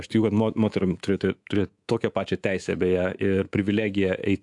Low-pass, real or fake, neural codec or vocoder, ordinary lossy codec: 10.8 kHz; fake; codec, 24 kHz, 3.1 kbps, DualCodec; AAC, 64 kbps